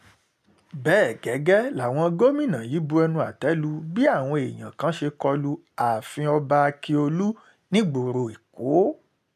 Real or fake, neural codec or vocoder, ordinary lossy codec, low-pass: real; none; none; 14.4 kHz